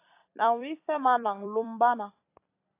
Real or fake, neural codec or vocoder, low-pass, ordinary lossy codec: fake; vocoder, 44.1 kHz, 128 mel bands every 256 samples, BigVGAN v2; 3.6 kHz; AAC, 24 kbps